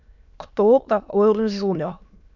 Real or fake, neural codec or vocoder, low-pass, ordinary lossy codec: fake; autoencoder, 22.05 kHz, a latent of 192 numbers a frame, VITS, trained on many speakers; 7.2 kHz; none